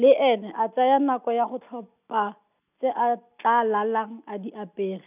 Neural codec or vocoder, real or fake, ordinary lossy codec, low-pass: none; real; none; 3.6 kHz